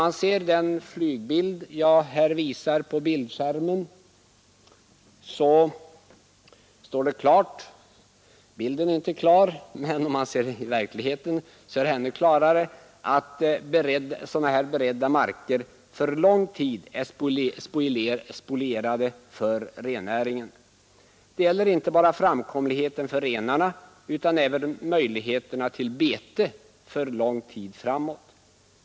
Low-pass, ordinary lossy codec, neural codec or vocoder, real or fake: none; none; none; real